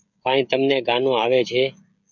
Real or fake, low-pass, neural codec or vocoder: fake; 7.2 kHz; codec, 16 kHz, 16 kbps, FreqCodec, smaller model